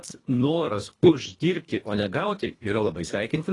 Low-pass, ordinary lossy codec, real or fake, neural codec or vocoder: 10.8 kHz; AAC, 32 kbps; fake; codec, 24 kHz, 1.5 kbps, HILCodec